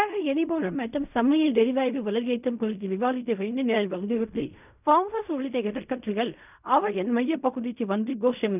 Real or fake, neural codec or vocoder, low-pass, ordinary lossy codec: fake; codec, 16 kHz in and 24 kHz out, 0.4 kbps, LongCat-Audio-Codec, fine tuned four codebook decoder; 3.6 kHz; none